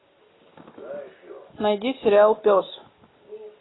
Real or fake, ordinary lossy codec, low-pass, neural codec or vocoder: real; AAC, 16 kbps; 7.2 kHz; none